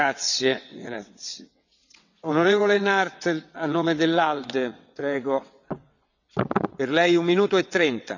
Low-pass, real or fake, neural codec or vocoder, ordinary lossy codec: 7.2 kHz; fake; vocoder, 22.05 kHz, 80 mel bands, WaveNeXt; none